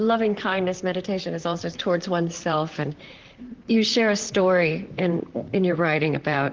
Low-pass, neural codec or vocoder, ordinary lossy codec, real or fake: 7.2 kHz; vocoder, 44.1 kHz, 128 mel bands, Pupu-Vocoder; Opus, 16 kbps; fake